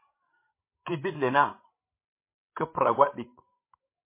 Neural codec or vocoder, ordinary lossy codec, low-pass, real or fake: codec, 16 kHz, 16 kbps, FreqCodec, larger model; MP3, 24 kbps; 3.6 kHz; fake